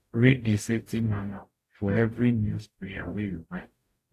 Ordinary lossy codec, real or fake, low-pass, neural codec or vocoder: AAC, 64 kbps; fake; 14.4 kHz; codec, 44.1 kHz, 0.9 kbps, DAC